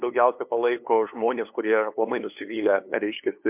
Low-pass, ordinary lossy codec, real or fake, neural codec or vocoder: 3.6 kHz; MP3, 32 kbps; fake; codec, 16 kHz, 2 kbps, FunCodec, trained on LibriTTS, 25 frames a second